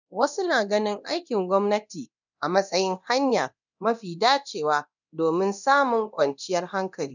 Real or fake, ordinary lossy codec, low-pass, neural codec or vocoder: fake; none; 7.2 kHz; codec, 24 kHz, 0.9 kbps, DualCodec